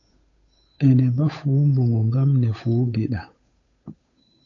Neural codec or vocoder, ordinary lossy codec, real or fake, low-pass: codec, 16 kHz, 8 kbps, FunCodec, trained on Chinese and English, 25 frames a second; AAC, 48 kbps; fake; 7.2 kHz